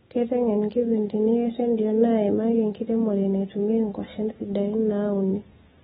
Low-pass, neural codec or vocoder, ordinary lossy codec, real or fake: 19.8 kHz; none; AAC, 16 kbps; real